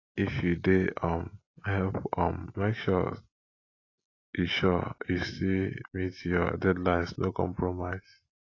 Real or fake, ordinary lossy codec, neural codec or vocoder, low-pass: real; AAC, 32 kbps; none; 7.2 kHz